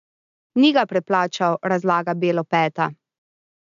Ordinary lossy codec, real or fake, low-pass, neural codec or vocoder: AAC, 96 kbps; real; 7.2 kHz; none